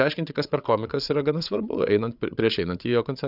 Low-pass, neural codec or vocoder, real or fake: 5.4 kHz; codec, 16 kHz, 8 kbps, FreqCodec, larger model; fake